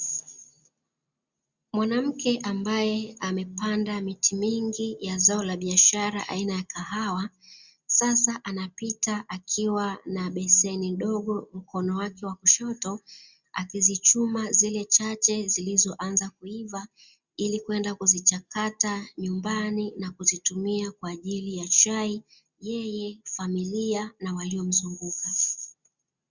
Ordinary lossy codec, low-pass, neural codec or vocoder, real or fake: Opus, 64 kbps; 7.2 kHz; none; real